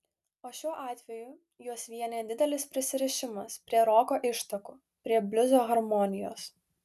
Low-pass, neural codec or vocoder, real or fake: 14.4 kHz; none; real